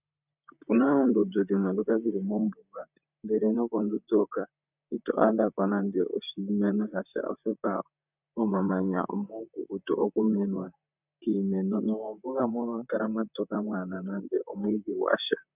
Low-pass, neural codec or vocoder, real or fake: 3.6 kHz; vocoder, 44.1 kHz, 128 mel bands, Pupu-Vocoder; fake